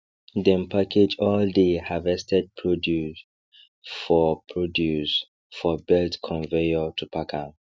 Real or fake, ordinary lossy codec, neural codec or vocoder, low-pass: real; none; none; none